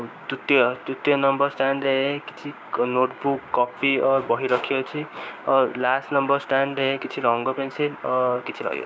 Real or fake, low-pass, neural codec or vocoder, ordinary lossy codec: fake; none; codec, 16 kHz, 6 kbps, DAC; none